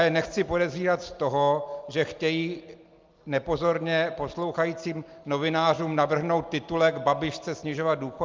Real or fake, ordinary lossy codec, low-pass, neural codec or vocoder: real; Opus, 32 kbps; 7.2 kHz; none